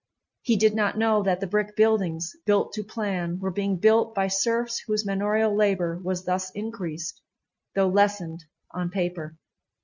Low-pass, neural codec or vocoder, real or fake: 7.2 kHz; none; real